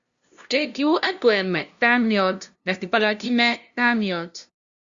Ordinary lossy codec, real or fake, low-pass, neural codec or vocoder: Opus, 64 kbps; fake; 7.2 kHz; codec, 16 kHz, 0.5 kbps, FunCodec, trained on LibriTTS, 25 frames a second